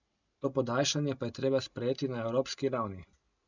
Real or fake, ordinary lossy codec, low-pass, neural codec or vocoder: real; none; 7.2 kHz; none